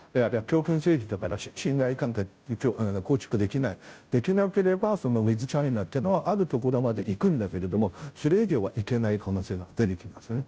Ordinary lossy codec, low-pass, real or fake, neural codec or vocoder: none; none; fake; codec, 16 kHz, 0.5 kbps, FunCodec, trained on Chinese and English, 25 frames a second